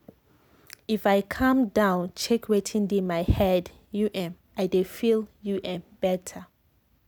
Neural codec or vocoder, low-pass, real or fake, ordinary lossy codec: none; none; real; none